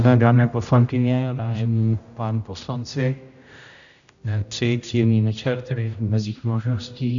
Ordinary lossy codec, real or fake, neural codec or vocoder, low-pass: MP3, 96 kbps; fake; codec, 16 kHz, 0.5 kbps, X-Codec, HuBERT features, trained on general audio; 7.2 kHz